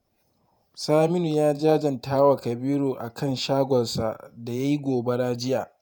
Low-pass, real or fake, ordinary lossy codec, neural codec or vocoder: none; fake; none; vocoder, 48 kHz, 128 mel bands, Vocos